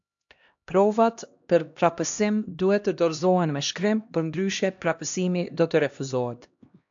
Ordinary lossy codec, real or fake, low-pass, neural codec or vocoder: AAC, 64 kbps; fake; 7.2 kHz; codec, 16 kHz, 1 kbps, X-Codec, HuBERT features, trained on LibriSpeech